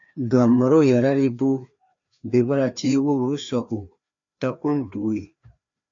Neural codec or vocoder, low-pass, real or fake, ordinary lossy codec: codec, 16 kHz, 2 kbps, FreqCodec, larger model; 7.2 kHz; fake; MP3, 64 kbps